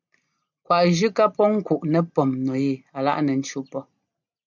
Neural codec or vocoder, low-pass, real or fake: none; 7.2 kHz; real